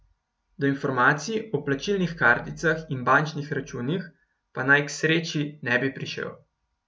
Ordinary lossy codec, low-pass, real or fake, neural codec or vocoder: none; none; real; none